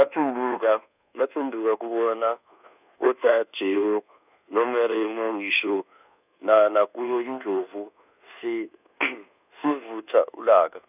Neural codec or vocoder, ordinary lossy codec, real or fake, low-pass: codec, 24 kHz, 1.2 kbps, DualCodec; none; fake; 3.6 kHz